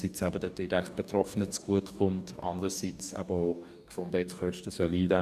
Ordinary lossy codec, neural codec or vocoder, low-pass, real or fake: none; codec, 44.1 kHz, 2.6 kbps, DAC; 14.4 kHz; fake